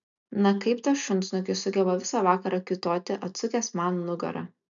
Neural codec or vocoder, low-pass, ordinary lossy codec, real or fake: none; 7.2 kHz; MP3, 96 kbps; real